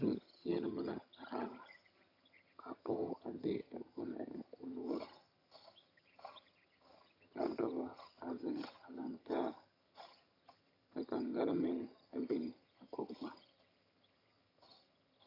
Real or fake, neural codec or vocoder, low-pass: fake; vocoder, 22.05 kHz, 80 mel bands, HiFi-GAN; 5.4 kHz